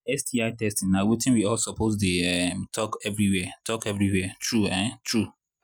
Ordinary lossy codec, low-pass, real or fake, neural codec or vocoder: none; 19.8 kHz; real; none